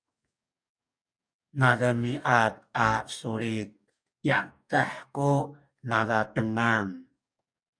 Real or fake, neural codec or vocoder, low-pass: fake; codec, 44.1 kHz, 2.6 kbps, DAC; 9.9 kHz